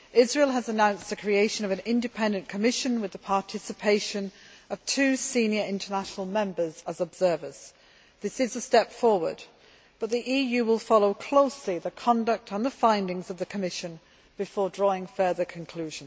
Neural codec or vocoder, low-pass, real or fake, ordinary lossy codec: none; none; real; none